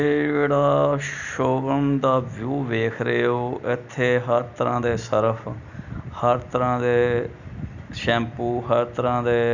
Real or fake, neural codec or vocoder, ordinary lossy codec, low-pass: real; none; none; 7.2 kHz